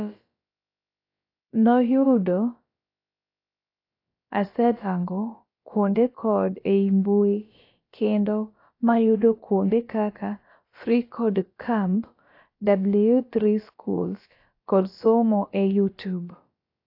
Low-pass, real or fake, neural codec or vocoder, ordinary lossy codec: 5.4 kHz; fake; codec, 16 kHz, about 1 kbps, DyCAST, with the encoder's durations; AAC, 32 kbps